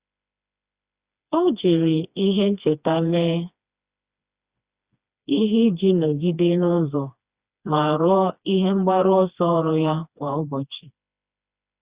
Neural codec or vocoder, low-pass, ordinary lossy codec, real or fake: codec, 16 kHz, 2 kbps, FreqCodec, smaller model; 3.6 kHz; Opus, 64 kbps; fake